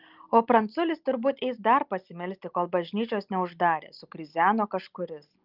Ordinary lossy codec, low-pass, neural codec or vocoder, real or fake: Opus, 32 kbps; 5.4 kHz; none; real